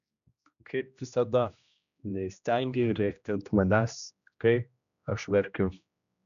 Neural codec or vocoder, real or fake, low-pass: codec, 16 kHz, 1 kbps, X-Codec, HuBERT features, trained on general audio; fake; 7.2 kHz